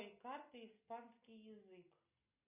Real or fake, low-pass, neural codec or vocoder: real; 3.6 kHz; none